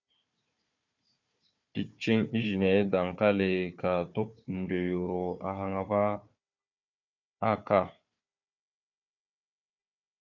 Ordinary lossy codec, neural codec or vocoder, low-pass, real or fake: MP3, 48 kbps; codec, 16 kHz, 4 kbps, FunCodec, trained on Chinese and English, 50 frames a second; 7.2 kHz; fake